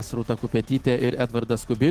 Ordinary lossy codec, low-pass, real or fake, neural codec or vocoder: Opus, 16 kbps; 14.4 kHz; fake; autoencoder, 48 kHz, 128 numbers a frame, DAC-VAE, trained on Japanese speech